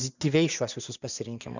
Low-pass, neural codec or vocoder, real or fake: 7.2 kHz; vocoder, 44.1 kHz, 80 mel bands, Vocos; fake